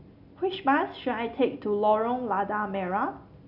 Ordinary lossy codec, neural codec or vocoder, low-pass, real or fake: none; none; 5.4 kHz; real